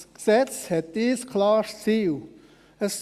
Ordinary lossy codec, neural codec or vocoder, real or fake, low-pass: Opus, 64 kbps; none; real; 14.4 kHz